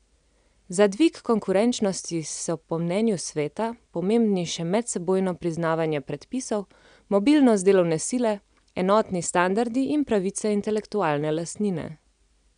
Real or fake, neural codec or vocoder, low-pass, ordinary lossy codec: real; none; 9.9 kHz; none